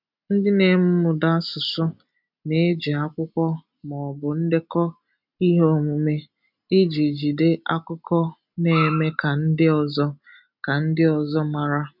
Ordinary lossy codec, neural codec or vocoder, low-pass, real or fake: none; none; 5.4 kHz; real